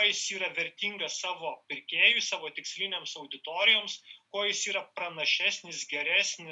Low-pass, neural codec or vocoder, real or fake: 10.8 kHz; none; real